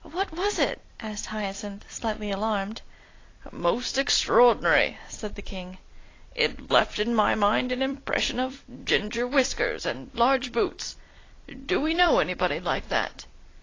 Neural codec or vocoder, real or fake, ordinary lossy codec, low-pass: vocoder, 44.1 kHz, 128 mel bands every 256 samples, BigVGAN v2; fake; AAC, 32 kbps; 7.2 kHz